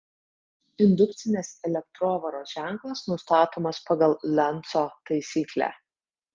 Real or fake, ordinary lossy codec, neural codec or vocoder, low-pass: real; Opus, 24 kbps; none; 7.2 kHz